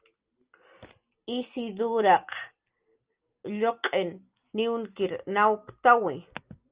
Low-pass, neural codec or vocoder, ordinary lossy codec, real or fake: 3.6 kHz; none; Opus, 24 kbps; real